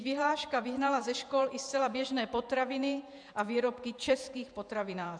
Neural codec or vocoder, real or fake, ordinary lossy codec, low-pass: vocoder, 48 kHz, 128 mel bands, Vocos; fake; MP3, 96 kbps; 9.9 kHz